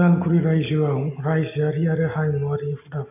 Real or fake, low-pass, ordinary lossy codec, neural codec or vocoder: real; 3.6 kHz; AAC, 32 kbps; none